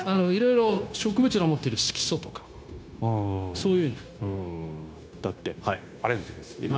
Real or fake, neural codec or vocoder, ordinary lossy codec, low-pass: fake; codec, 16 kHz, 0.9 kbps, LongCat-Audio-Codec; none; none